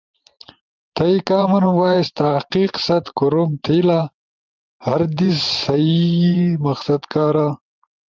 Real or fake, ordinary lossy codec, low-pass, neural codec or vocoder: fake; Opus, 32 kbps; 7.2 kHz; vocoder, 44.1 kHz, 128 mel bands every 512 samples, BigVGAN v2